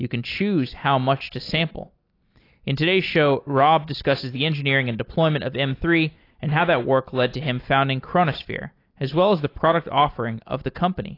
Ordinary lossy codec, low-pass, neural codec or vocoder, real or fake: AAC, 32 kbps; 5.4 kHz; none; real